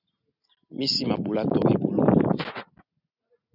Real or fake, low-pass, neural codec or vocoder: real; 5.4 kHz; none